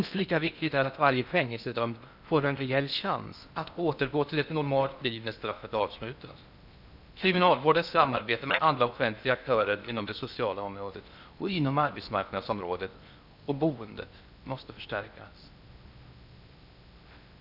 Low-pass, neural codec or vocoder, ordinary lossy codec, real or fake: 5.4 kHz; codec, 16 kHz in and 24 kHz out, 0.8 kbps, FocalCodec, streaming, 65536 codes; none; fake